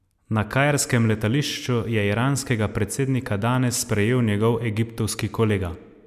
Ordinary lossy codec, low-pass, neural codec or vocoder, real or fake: none; 14.4 kHz; none; real